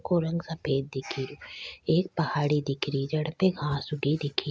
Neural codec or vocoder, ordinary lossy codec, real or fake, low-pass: none; none; real; none